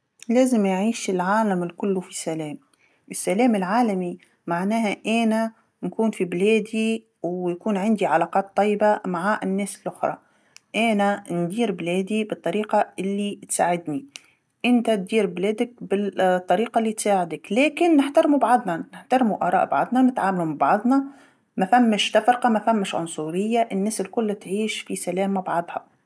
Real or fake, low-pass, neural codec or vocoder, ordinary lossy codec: real; none; none; none